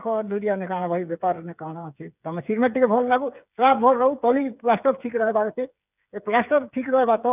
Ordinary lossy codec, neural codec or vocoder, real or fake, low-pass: none; codec, 16 kHz, 8 kbps, FreqCodec, smaller model; fake; 3.6 kHz